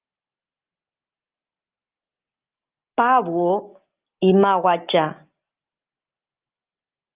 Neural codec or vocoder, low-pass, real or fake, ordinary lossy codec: none; 3.6 kHz; real; Opus, 32 kbps